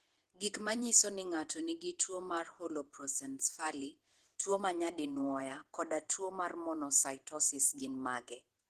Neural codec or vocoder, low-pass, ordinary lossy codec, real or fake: vocoder, 48 kHz, 128 mel bands, Vocos; 14.4 kHz; Opus, 16 kbps; fake